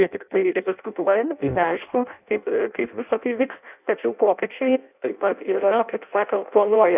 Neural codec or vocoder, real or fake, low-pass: codec, 16 kHz in and 24 kHz out, 0.6 kbps, FireRedTTS-2 codec; fake; 3.6 kHz